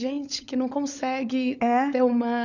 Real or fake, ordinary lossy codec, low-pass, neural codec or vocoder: fake; none; 7.2 kHz; codec, 16 kHz, 16 kbps, FunCodec, trained on LibriTTS, 50 frames a second